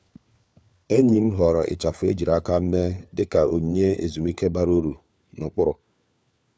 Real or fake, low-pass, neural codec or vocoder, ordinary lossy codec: fake; none; codec, 16 kHz, 4 kbps, FunCodec, trained on LibriTTS, 50 frames a second; none